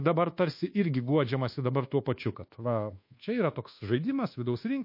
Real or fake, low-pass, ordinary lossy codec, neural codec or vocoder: fake; 5.4 kHz; MP3, 32 kbps; codec, 24 kHz, 1.2 kbps, DualCodec